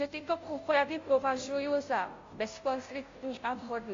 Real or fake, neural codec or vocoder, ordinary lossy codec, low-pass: fake; codec, 16 kHz, 0.5 kbps, FunCodec, trained on Chinese and English, 25 frames a second; AAC, 48 kbps; 7.2 kHz